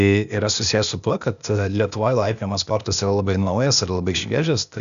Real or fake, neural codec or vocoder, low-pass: fake; codec, 16 kHz, 0.8 kbps, ZipCodec; 7.2 kHz